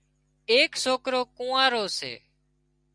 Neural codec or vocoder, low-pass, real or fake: none; 9.9 kHz; real